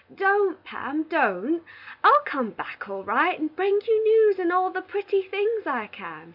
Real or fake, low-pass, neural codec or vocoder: real; 5.4 kHz; none